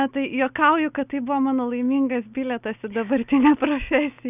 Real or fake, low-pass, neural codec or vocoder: real; 3.6 kHz; none